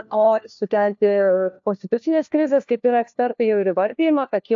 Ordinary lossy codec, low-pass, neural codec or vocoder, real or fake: AAC, 64 kbps; 7.2 kHz; codec, 16 kHz, 1 kbps, FunCodec, trained on LibriTTS, 50 frames a second; fake